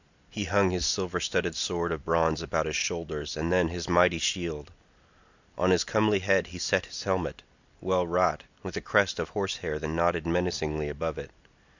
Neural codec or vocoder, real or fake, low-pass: none; real; 7.2 kHz